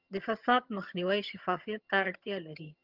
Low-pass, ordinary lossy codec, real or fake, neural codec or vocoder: 5.4 kHz; Opus, 24 kbps; fake; vocoder, 22.05 kHz, 80 mel bands, HiFi-GAN